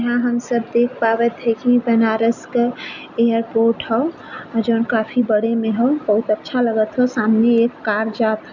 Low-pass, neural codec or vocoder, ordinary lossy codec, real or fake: 7.2 kHz; none; none; real